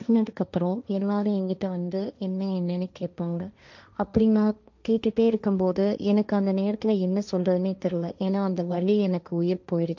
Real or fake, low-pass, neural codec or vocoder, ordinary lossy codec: fake; 7.2 kHz; codec, 16 kHz, 1.1 kbps, Voila-Tokenizer; none